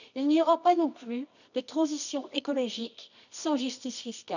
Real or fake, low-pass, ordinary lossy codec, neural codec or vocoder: fake; 7.2 kHz; none; codec, 24 kHz, 0.9 kbps, WavTokenizer, medium music audio release